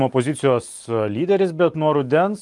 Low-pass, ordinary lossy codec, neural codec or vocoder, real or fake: 10.8 kHz; Opus, 32 kbps; none; real